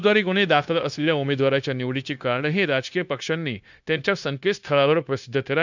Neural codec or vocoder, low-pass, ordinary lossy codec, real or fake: codec, 16 kHz, 0.9 kbps, LongCat-Audio-Codec; 7.2 kHz; none; fake